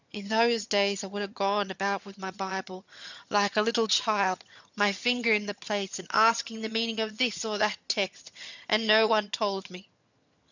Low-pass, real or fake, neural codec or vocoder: 7.2 kHz; fake; vocoder, 22.05 kHz, 80 mel bands, HiFi-GAN